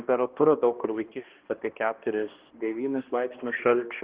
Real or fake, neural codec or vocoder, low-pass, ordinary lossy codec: fake; codec, 16 kHz, 1 kbps, X-Codec, HuBERT features, trained on balanced general audio; 3.6 kHz; Opus, 16 kbps